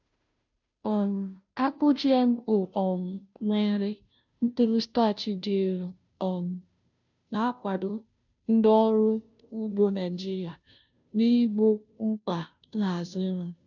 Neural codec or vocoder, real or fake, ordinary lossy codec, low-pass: codec, 16 kHz, 0.5 kbps, FunCodec, trained on Chinese and English, 25 frames a second; fake; none; 7.2 kHz